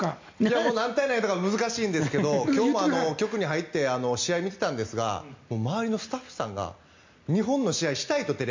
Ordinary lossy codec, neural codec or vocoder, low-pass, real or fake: MP3, 64 kbps; none; 7.2 kHz; real